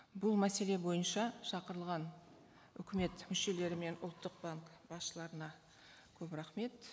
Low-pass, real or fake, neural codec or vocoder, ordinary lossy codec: none; real; none; none